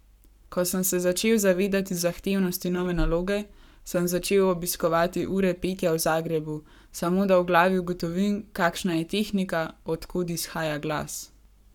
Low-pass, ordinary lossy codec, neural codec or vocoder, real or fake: 19.8 kHz; none; codec, 44.1 kHz, 7.8 kbps, Pupu-Codec; fake